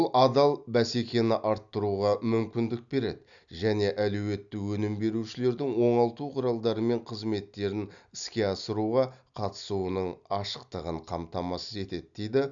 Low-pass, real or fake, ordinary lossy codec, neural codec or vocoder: 7.2 kHz; real; none; none